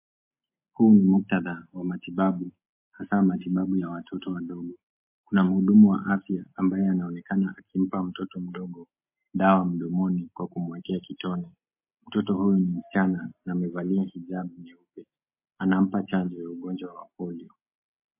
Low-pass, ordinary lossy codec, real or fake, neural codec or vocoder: 3.6 kHz; MP3, 24 kbps; real; none